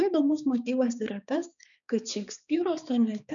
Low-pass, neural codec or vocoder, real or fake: 7.2 kHz; codec, 16 kHz, 4 kbps, X-Codec, HuBERT features, trained on balanced general audio; fake